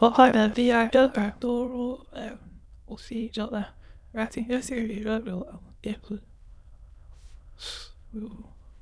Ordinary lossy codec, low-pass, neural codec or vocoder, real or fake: none; none; autoencoder, 22.05 kHz, a latent of 192 numbers a frame, VITS, trained on many speakers; fake